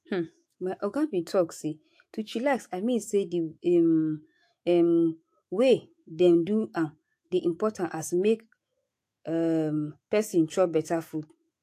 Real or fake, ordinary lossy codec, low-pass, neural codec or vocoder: fake; AAC, 64 kbps; 14.4 kHz; autoencoder, 48 kHz, 128 numbers a frame, DAC-VAE, trained on Japanese speech